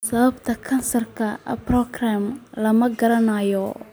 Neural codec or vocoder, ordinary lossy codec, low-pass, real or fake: none; none; none; real